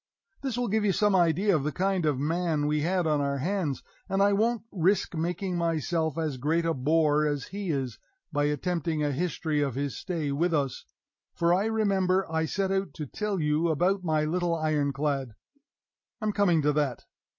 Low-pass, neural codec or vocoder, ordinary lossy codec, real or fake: 7.2 kHz; none; MP3, 32 kbps; real